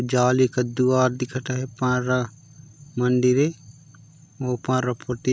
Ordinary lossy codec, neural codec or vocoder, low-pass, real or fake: none; none; none; real